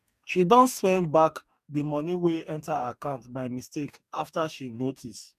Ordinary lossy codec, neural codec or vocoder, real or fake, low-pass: none; codec, 44.1 kHz, 2.6 kbps, DAC; fake; 14.4 kHz